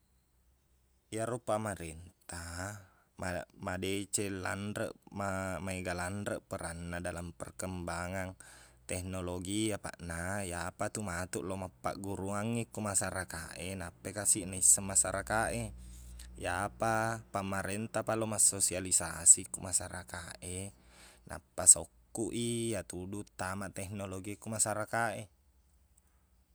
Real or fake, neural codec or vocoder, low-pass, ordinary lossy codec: real; none; none; none